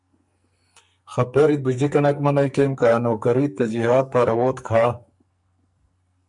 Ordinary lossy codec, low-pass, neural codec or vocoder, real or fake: MP3, 64 kbps; 10.8 kHz; codec, 44.1 kHz, 2.6 kbps, SNAC; fake